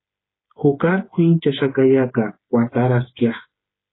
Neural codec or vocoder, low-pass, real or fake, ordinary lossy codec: codec, 16 kHz, 8 kbps, FreqCodec, smaller model; 7.2 kHz; fake; AAC, 16 kbps